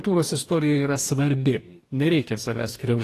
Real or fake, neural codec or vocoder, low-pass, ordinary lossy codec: fake; codec, 44.1 kHz, 2.6 kbps, DAC; 14.4 kHz; AAC, 48 kbps